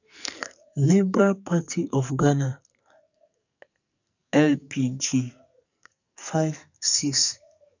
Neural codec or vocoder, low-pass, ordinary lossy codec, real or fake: codec, 44.1 kHz, 2.6 kbps, SNAC; 7.2 kHz; none; fake